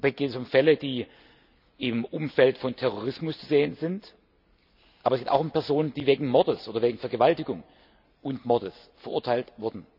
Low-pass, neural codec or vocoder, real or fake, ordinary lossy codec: 5.4 kHz; none; real; none